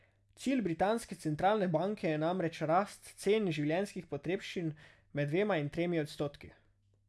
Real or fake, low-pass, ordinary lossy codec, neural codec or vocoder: real; none; none; none